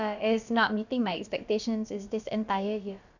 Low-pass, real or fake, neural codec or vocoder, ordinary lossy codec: 7.2 kHz; fake; codec, 16 kHz, about 1 kbps, DyCAST, with the encoder's durations; none